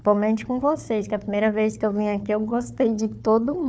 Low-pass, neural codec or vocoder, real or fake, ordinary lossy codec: none; codec, 16 kHz, 4 kbps, FunCodec, trained on Chinese and English, 50 frames a second; fake; none